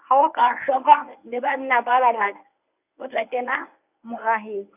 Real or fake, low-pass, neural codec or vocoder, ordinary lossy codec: fake; 3.6 kHz; codec, 24 kHz, 0.9 kbps, WavTokenizer, medium speech release version 1; none